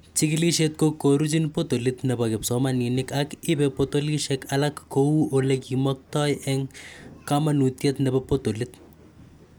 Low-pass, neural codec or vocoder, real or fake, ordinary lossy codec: none; none; real; none